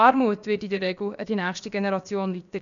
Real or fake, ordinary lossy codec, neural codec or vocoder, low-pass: fake; none; codec, 16 kHz, about 1 kbps, DyCAST, with the encoder's durations; 7.2 kHz